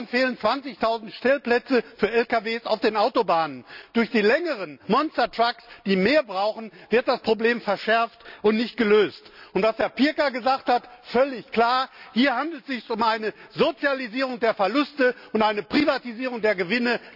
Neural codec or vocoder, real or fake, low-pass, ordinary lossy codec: none; real; 5.4 kHz; none